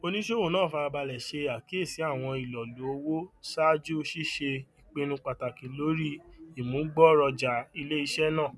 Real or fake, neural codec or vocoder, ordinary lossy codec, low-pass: real; none; none; none